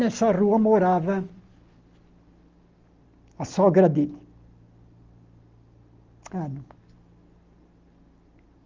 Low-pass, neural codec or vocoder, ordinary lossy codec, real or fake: 7.2 kHz; none; Opus, 24 kbps; real